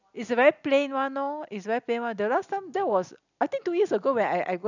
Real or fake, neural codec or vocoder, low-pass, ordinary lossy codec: real; none; 7.2 kHz; none